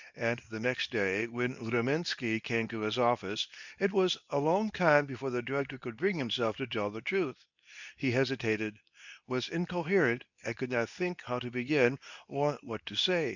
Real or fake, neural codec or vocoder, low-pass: fake; codec, 24 kHz, 0.9 kbps, WavTokenizer, medium speech release version 2; 7.2 kHz